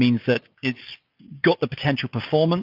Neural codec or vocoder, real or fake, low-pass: none; real; 5.4 kHz